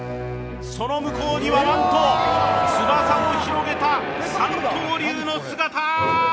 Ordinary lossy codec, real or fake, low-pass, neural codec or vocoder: none; real; none; none